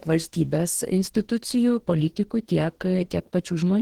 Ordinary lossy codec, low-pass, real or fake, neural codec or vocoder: Opus, 16 kbps; 19.8 kHz; fake; codec, 44.1 kHz, 2.6 kbps, DAC